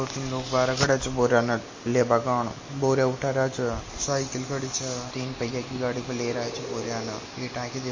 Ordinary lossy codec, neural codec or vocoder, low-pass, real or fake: MP3, 32 kbps; none; 7.2 kHz; real